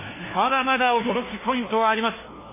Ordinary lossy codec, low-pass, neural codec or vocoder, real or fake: MP3, 16 kbps; 3.6 kHz; codec, 16 kHz, 1 kbps, FunCodec, trained on LibriTTS, 50 frames a second; fake